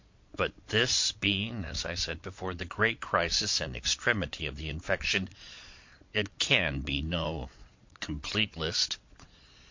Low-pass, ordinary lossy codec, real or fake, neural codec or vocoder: 7.2 kHz; MP3, 48 kbps; fake; vocoder, 22.05 kHz, 80 mel bands, Vocos